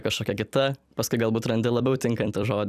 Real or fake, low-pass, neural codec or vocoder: fake; 14.4 kHz; vocoder, 44.1 kHz, 128 mel bands every 256 samples, BigVGAN v2